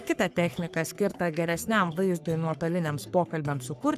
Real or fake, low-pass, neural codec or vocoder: fake; 14.4 kHz; codec, 44.1 kHz, 3.4 kbps, Pupu-Codec